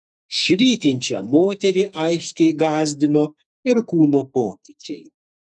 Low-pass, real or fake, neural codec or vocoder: 10.8 kHz; fake; codec, 44.1 kHz, 2.6 kbps, SNAC